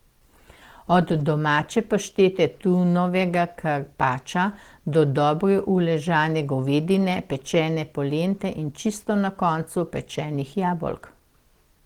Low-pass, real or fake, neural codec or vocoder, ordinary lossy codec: 19.8 kHz; real; none; Opus, 16 kbps